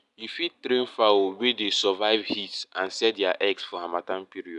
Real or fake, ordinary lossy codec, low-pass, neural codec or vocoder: real; none; 10.8 kHz; none